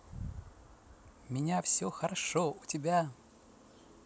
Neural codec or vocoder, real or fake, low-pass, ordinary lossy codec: none; real; none; none